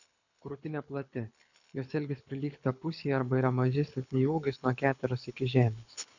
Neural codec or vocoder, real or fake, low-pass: codec, 24 kHz, 6 kbps, HILCodec; fake; 7.2 kHz